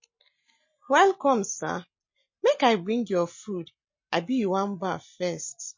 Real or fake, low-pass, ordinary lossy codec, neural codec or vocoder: real; 7.2 kHz; MP3, 32 kbps; none